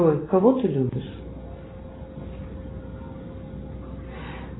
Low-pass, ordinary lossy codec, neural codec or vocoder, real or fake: 7.2 kHz; AAC, 16 kbps; none; real